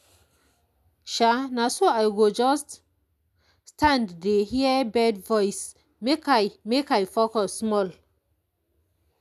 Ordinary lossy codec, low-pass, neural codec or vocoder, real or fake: none; 14.4 kHz; none; real